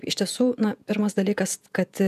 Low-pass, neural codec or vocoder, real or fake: 14.4 kHz; vocoder, 48 kHz, 128 mel bands, Vocos; fake